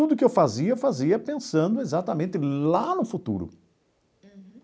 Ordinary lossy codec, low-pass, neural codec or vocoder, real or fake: none; none; none; real